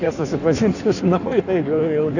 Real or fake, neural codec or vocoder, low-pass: fake; codec, 16 kHz in and 24 kHz out, 1.1 kbps, FireRedTTS-2 codec; 7.2 kHz